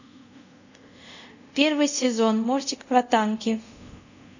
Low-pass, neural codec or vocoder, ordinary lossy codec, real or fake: 7.2 kHz; codec, 24 kHz, 0.5 kbps, DualCodec; none; fake